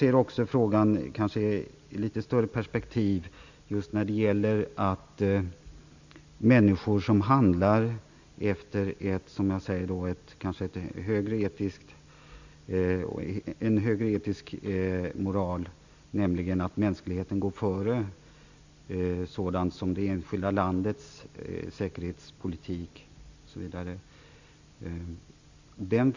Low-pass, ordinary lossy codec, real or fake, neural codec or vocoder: 7.2 kHz; Opus, 64 kbps; real; none